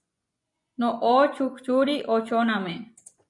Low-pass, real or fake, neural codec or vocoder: 10.8 kHz; fake; vocoder, 24 kHz, 100 mel bands, Vocos